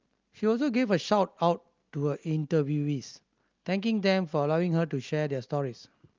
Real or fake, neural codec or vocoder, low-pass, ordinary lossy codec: real; none; 7.2 kHz; Opus, 32 kbps